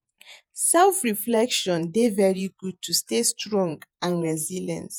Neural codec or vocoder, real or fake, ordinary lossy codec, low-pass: vocoder, 48 kHz, 128 mel bands, Vocos; fake; none; none